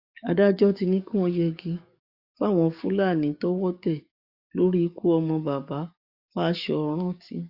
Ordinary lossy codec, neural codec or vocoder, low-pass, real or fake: Opus, 64 kbps; codec, 44.1 kHz, 7.8 kbps, DAC; 5.4 kHz; fake